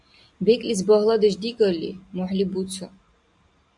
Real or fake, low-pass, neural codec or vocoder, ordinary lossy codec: real; 10.8 kHz; none; AAC, 64 kbps